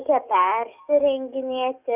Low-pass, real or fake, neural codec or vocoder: 3.6 kHz; fake; codec, 44.1 kHz, 7.8 kbps, DAC